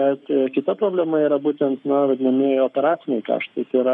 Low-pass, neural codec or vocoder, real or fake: 10.8 kHz; codec, 44.1 kHz, 7.8 kbps, Pupu-Codec; fake